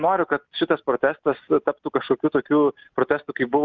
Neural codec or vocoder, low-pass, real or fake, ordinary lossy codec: none; 7.2 kHz; real; Opus, 24 kbps